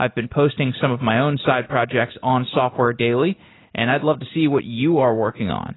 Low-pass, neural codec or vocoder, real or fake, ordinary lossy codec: 7.2 kHz; none; real; AAC, 16 kbps